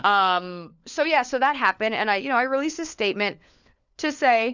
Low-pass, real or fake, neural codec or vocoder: 7.2 kHz; fake; codec, 16 kHz, 4 kbps, FunCodec, trained on LibriTTS, 50 frames a second